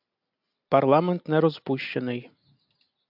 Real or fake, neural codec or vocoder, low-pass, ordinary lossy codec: real; none; 5.4 kHz; AAC, 48 kbps